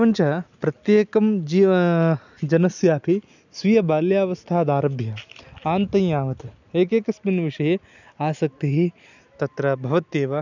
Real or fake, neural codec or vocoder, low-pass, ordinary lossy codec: real; none; 7.2 kHz; none